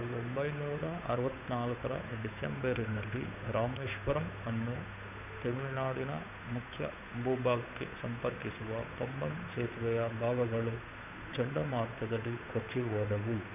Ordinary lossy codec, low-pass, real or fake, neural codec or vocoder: none; 3.6 kHz; fake; codec, 16 kHz, 8 kbps, FunCodec, trained on Chinese and English, 25 frames a second